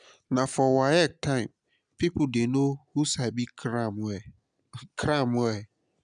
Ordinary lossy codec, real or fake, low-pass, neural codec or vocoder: none; real; 9.9 kHz; none